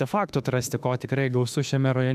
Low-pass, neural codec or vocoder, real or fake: 14.4 kHz; autoencoder, 48 kHz, 32 numbers a frame, DAC-VAE, trained on Japanese speech; fake